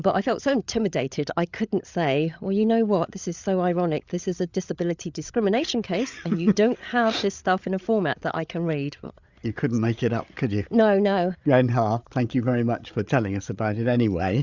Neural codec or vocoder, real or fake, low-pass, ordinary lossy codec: codec, 16 kHz, 8 kbps, FreqCodec, larger model; fake; 7.2 kHz; Opus, 64 kbps